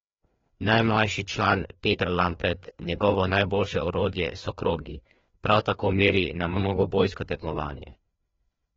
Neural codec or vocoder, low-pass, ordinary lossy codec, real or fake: codec, 16 kHz, 2 kbps, FreqCodec, larger model; 7.2 kHz; AAC, 24 kbps; fake